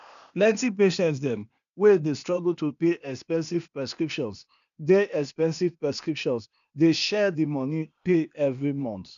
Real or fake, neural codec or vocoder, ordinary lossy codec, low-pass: fake; codec, 16 kHz, 0.8 kbps, ZipCodec; none; 7.2 kHz